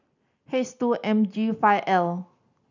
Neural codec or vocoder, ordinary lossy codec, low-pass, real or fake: none; none; 7.2 kHz; real